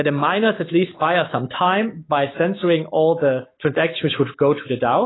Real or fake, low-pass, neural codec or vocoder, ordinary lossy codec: fake; 7.2 kHz; autoencoder, 48 kHz, 128 numbers a frame, DAC-VAE, trained on Japanese speech; AAC, 16 kbps